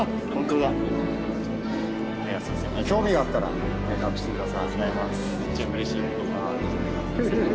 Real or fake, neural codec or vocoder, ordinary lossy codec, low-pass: real; none; none; none